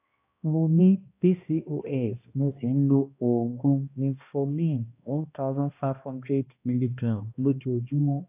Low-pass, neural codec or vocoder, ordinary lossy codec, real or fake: 3.6 kHz; codec, 16 kHz, 1 kbps, X-Codec, HuBERT features, trained on balanced general audio; AAC, 32 kbps; fake